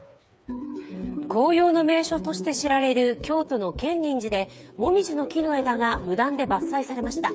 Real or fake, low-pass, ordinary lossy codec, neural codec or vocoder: fake; none; none; codec, 16 kHz, 4 kbps, FreqCodec, smaller model